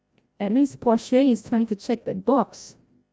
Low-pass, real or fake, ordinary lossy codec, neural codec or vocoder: none; fake; none; codec, 16 kHz, 0.5 kbps, FreqCodec, larger model